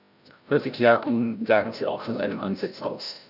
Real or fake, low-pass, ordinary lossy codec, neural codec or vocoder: fake; 5.4 kHz; none; codec, 16 kHz, 0.5 kbps, FreqCodec, larger model